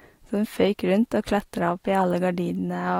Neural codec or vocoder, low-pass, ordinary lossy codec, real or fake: vocoder, 44.1 kHz, 128 mel bands every 256 samples, BigVGAN v2; 19.8 kHz; AAC, 48 kbps; fake